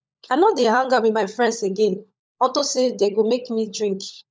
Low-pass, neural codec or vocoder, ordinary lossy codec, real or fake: none; codec, 16 kHz, 16 kbps, FunCodec, trained on LibriTTS, 50 frames a second; none; fake